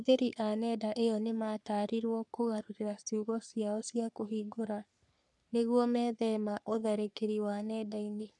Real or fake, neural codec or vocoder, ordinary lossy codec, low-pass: fake; codec, 44.1 kHz, 3.4 kbps, Pupu-Codec; none; 10.8 kHz